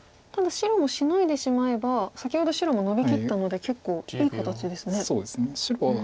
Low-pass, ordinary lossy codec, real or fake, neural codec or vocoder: none; none; real; none